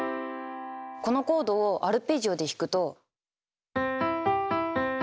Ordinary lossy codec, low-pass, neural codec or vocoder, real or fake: none; none; none; real